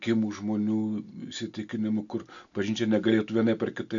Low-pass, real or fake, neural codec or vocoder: 7.2 kHz; real; none